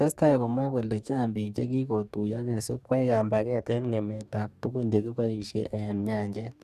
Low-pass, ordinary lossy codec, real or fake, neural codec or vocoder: 14.4 kHz; none; fake; codec, 44.1 kHz, 2.6 kbps, DAC